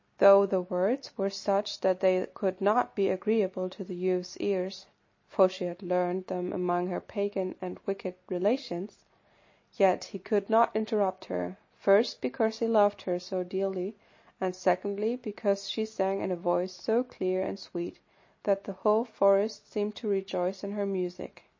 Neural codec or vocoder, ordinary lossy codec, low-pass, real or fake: none; MP3, 32 kbps; 7.2 kHz; real